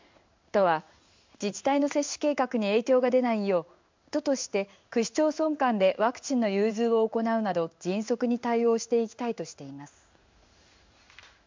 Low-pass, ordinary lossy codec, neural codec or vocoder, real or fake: 7.2 kHz; MP3, 64 kbps; codec, 16 kHz in and 24 kHz out, 1 kbps, XY-Tokenizer; fake